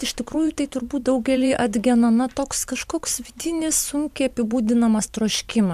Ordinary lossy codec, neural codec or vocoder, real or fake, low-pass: MP3, 96 kbps; vocoder, 44.1 kHz, 128 mel bands every 512 samples, BigVGAN v2; fake; 14.4 kHz